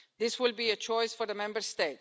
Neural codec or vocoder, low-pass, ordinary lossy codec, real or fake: none; none; none; real